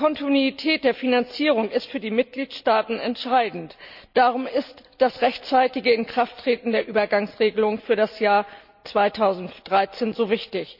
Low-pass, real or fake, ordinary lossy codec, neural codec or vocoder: 5.4 kHz; real; none; none